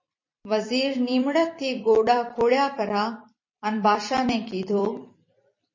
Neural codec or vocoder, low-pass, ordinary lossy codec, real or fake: vocoder, 44.1 kHz, 128 mel bands every 256 samples, BigVGAN v2; 7.2 kHz; MP3, 32 kbps; fake